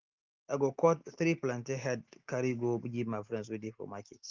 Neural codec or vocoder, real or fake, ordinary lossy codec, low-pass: none; real; Opus, 24 kbps; 7.2 kHz